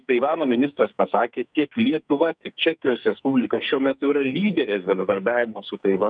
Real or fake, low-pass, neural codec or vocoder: fake; 9.9 kHz; codec, 44.1 kHz, 2.6 kbps, SNAC